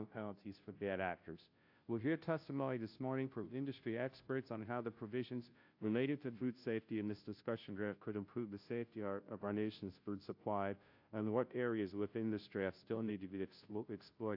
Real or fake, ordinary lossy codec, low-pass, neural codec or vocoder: fake; AAC, 48 kbps; 5.4 kHz; codec, 16 kHz, 0.5 kbps, FunCodec, trained on Chinese and English, 25 frames a second